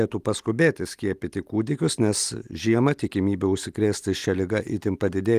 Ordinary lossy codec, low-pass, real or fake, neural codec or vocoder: Opus, 24 kbps; 14.4 kHz; real; none